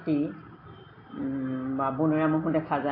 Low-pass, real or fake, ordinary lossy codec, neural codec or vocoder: 5.4 kHz; real; AAC, 24 kbps; none